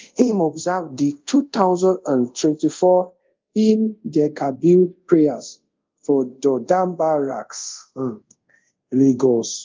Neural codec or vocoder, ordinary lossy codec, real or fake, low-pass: codec, 24 kHz, 0.5 kbps, DualCodec; Opus, 32 kbps; fake; 7.2 kHz